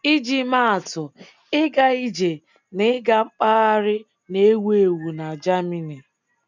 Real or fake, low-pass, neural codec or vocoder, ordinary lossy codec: real; 7.2 kHz; none; none